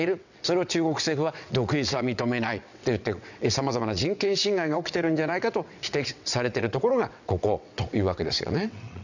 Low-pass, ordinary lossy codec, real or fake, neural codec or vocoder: 7.2 kHz; none; fake; vocoder, 22.05 kHz, 80 mel bands, WaveNeXt